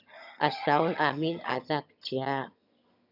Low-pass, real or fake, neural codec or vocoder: 5.4 kHz; fake; vocoder, 22.05 kHz, 80 mel bands, HiFi-GAN